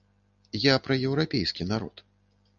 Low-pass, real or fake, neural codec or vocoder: 7.2 kHz; real; none